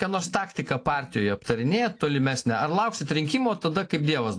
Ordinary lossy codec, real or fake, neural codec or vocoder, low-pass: AAC, 48 kbps; real; none; 9.9 kHz